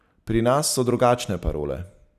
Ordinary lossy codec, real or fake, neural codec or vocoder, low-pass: none; real; none; 14.4 kHz